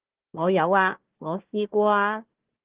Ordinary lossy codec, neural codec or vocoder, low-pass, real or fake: Opus, 16 kbps; codec, 16 kHz, 1 kbps, FunCodec, trained on Chinese and English, 50 frames a second; 3.6 kHz; fake